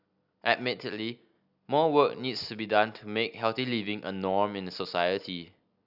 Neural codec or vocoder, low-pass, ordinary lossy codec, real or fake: none; 5.4 kHz; none; real